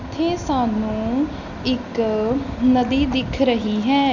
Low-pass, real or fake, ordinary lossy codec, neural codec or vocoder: 7.2 kHz; real; none; none